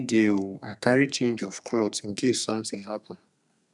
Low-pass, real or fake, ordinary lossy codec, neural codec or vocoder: 10.8 kHz; fake; none; codec, 32 kHz, 1.9 kbps, SNAC